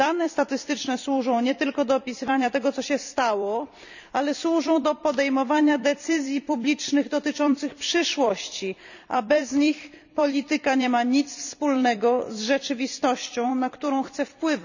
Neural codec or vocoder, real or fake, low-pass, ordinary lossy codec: vocoder, 44.1 kHz, 128 mel bands every 256 samples, BigVGAN v2; fake; 7.2 kHz; none